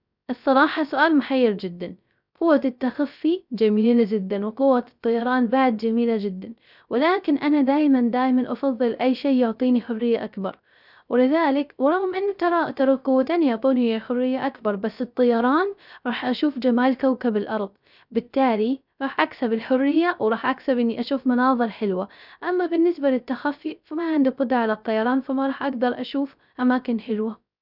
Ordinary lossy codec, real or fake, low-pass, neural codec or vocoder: none; fake; 5.4 kHz; codec, 16 kHz, 0.3 kbps, FocalCodec